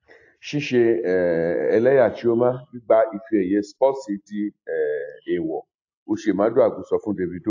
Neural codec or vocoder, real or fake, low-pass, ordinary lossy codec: none; real; 7.2 kHz; AAC, 48 kbps